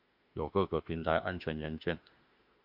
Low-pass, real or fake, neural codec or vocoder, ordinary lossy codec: 5.4 kHz; fake; autoencoder, 48 kHz, 32 numbers a frame, DAC-VAE, trained on Japanese speech; MP3, 48 kbps